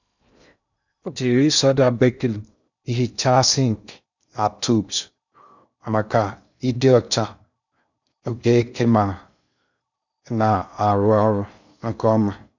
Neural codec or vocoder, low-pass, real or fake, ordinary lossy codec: codec, 16 kHz in and 24 kHz out, 0.6 kbps, FocalCodec, streaming, 2048 codes; 7.2 kHz; fake; none